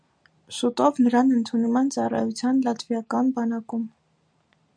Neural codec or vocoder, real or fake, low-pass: none; real; 9.9 kHz